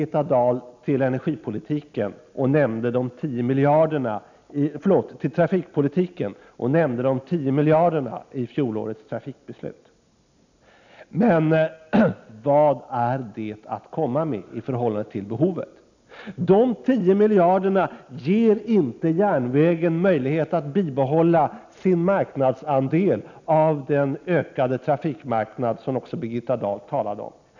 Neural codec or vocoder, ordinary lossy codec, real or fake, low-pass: none; none; real; 7.2 kHz